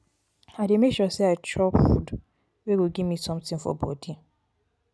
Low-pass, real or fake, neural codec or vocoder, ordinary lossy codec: none; real; none; none